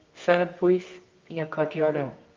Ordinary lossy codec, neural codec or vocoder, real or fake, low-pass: Opus, 32 kbps; codec, 24 kHz, 0.9 kbps, WavTokenizer, medium music audio release; fake; 7.2 kHz